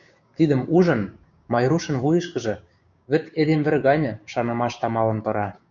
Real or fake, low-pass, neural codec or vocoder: fake; 7.2 kHz; codec, 16 kHz, 6 kbps, DAC